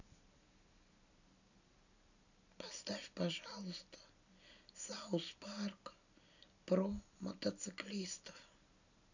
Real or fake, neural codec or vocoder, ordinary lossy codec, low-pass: real; none; none; 7.2 kHz